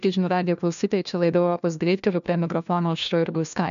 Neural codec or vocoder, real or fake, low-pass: codec, 16 kHz, 1 kbps, FunCodec, trained on LibriTTS, 50 frames a second; fake; 7.2 kHz